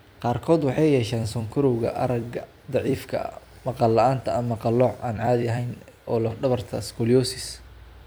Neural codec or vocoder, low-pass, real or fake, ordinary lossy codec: vocoder, 44.1 kHz, 128 mel bands every 256 samples, BigVGAN v2; none; fake; none